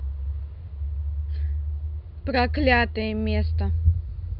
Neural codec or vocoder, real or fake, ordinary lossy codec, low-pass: none; real; none; 5.4 kHz